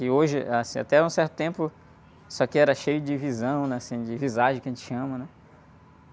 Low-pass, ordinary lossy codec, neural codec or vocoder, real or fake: none; none; none; real